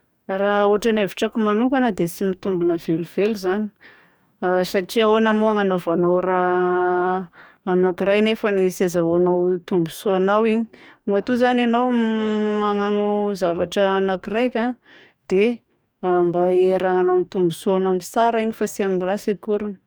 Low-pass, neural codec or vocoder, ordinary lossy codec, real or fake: none; codec, 44.1 kHz, 2.6 kbps, DAC; none; fake